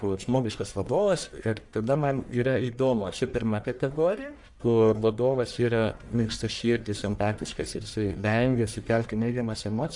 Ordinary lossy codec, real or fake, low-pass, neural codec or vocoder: MP3, 96 kbps; fake; 10.8 kHz; codec, 44.1 kHz, 1.7 kbps, Pupu-Codec